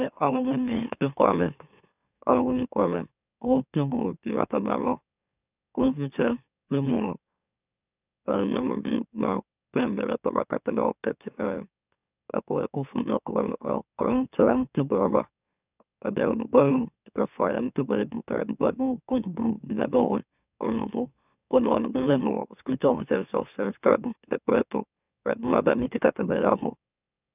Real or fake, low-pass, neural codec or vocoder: fake; 3.6 kHz; autoencoder, 44.1 kHz, a latent of 192 numbers a frame, MeloTTS